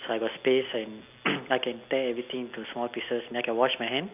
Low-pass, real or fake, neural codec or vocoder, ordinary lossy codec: 3.6 kHz; real; none; none